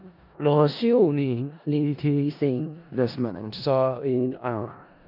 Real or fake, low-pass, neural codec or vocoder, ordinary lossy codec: fake; 5.4 kHz; codec, 16 kHz in and 24 kHz out, 0.4 kbps, LongCat-Audio-Codec, four codebook decoder; none